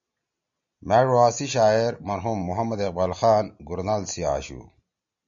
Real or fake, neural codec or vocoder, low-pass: real; none; 7.2 kHz